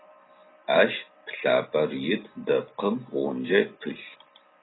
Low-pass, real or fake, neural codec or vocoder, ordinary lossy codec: 7.2 kHz; real; none; AAC, 16 kbps